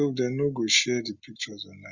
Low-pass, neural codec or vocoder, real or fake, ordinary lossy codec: 7.2 kHz; none; real; Opus, 64 kbps